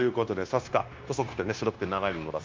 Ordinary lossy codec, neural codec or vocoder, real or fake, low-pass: Opus, 32 kbps; codec, 16 kHz, 0.9 kbps, LongCat-Audio-Codec; fake; 7.2 kHz